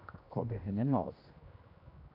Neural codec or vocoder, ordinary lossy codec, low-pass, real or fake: codec, 16 kHz, 1 kbps, X-Codec, HuBERT features, trained on general audio; AAC, 32 kbps; 5.4 kHz; fake